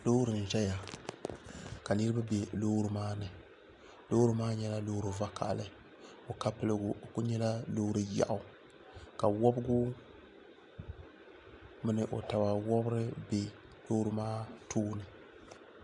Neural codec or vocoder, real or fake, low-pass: none; real; 10.8 kHz